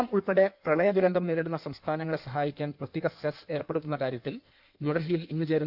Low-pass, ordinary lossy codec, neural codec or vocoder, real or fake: 5.4 kHz; none; codec, 16 kHz in and 24 kHz out, 1.1 kbps, FireRedTTS-2 codec; fake